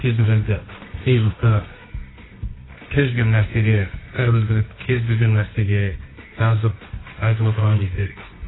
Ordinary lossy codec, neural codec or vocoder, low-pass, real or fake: AAC, 16 kbps; codec, 24 kHz, 0.9 kbps, WavTokenizer, medium music audio release; 7.2 kHz; fake